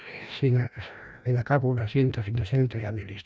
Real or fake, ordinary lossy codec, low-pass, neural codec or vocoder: fake; none; none; codec, 16 kHz, 1 kbps, FreqCodec, larger model